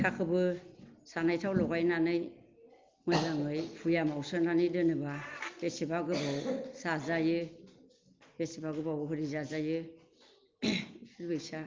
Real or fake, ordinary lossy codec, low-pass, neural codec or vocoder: real; Opus, 24 kbps; 7.2 kHz; none